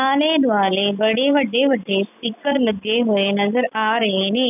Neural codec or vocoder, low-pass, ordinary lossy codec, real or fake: none; 3.6 kHz; none; real